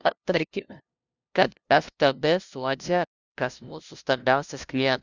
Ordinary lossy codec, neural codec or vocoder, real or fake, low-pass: Opus, 64 kbps; codec, 16 kHz, 0.5 kbps, FunCodec, trained on LibriTTS, 25 frames a second; fake; 7.2 kHz